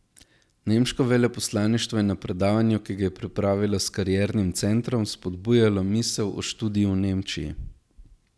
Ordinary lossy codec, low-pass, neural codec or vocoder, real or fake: none; none; none; real